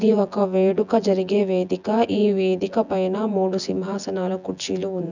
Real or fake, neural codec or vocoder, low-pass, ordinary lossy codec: fake; vocoder, 24 kHz, 100 mel bands, Vocos; 7.2 kHz; none